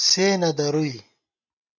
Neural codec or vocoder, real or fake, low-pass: none; real; 7.2 kHz